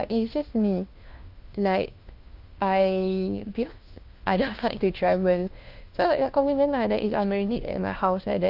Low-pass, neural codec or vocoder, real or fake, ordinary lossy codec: 5.4 kHz; codec, 16 kHz, 1 kbps, FunCodec, trained on LibriTTS, 50 frames a second; fake; Opus, 24 kbps